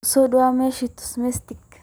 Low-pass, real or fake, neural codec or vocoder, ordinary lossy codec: none; real; none; none